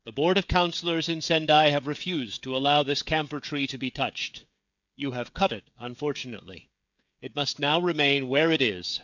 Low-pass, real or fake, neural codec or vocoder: 7.2 kHz; fake; codec, 16 kHz, 16 kbps, FreqCodec, smaller model